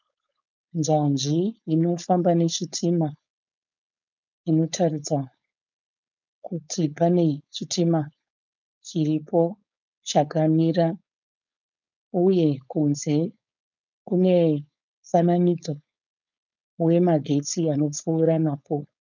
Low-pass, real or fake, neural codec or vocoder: 7.2 kHz; fake; codec, 16 kHz, 4.8 kbps, FACodec